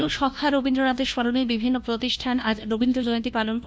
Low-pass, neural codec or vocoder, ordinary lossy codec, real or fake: none; codec, 16 kHz, 1 kbps, FunCodec, trained on LibriTTS, 50 frames a second; none; fake